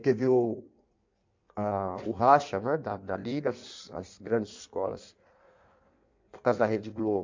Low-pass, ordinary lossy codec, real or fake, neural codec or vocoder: 7.2 kHz; MP3, 64 kbps; fake; codec, 16 kHz in and 24 kHz out, 1.1 kbps, FireRedTTS-2 codec